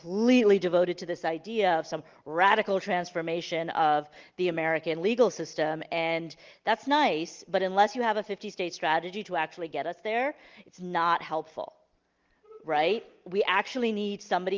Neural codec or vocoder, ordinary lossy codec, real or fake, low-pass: none; Opus, 32 kbps; real; 7.2 kHz